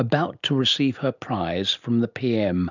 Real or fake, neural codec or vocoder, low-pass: real; none; 7.2 kHz